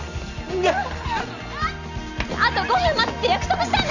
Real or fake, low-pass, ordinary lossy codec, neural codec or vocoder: real; 7.2 kHz; none; none